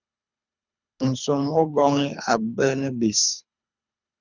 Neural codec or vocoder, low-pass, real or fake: codec, 24 kHz, 3 kbps, HILCodec; 7.2 kHz; fake